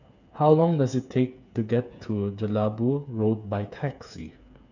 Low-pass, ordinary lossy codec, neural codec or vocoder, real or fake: 7.2 kHz; none; codec, 16 kHz, 8 kbps, FreqCodec, smaller model; fake